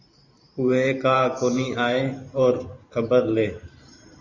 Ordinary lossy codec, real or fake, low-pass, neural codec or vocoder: Opus, 64 kbps; fake; 7.2 kHz; vocoder, 44.1 kHz, 128 mel bands every 512 samples, BigVGAN v2